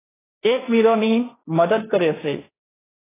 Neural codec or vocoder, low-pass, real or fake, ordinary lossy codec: codec, 16 kHz, 1.1 kbps, Voila-Tokenizer; 3.6 kHz; fake; AAC, 16 kbps